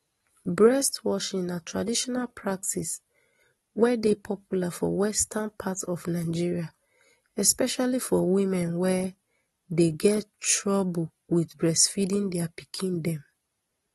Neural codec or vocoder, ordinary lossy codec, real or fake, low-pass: none; AAC, 32 kbps; real; 19.8 kHz